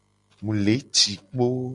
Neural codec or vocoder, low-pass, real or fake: none; 10.8 kHz; real